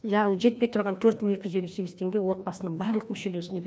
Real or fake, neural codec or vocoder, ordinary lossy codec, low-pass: fake; codec, 16 kHz, 1 kbps, FreqCodec, larger model; none; none